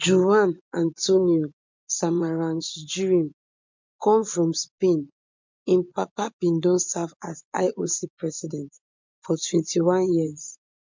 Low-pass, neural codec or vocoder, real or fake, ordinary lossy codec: 7.2 kHz; vocoder, 24 kHz, 100 mel bands, Vocos; fake; MP3, 64 kbps